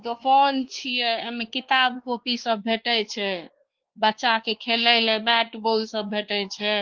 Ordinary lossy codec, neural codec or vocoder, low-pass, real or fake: Opus, 16 kbps; codec, 16 kHz, 2 kbps, X-Codec, WavLM features, trained on Multilingual LibriSpeech; 7.2 kHz; fake